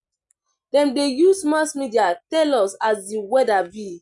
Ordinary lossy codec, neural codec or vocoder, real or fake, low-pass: none; none; real; 10.8 kHz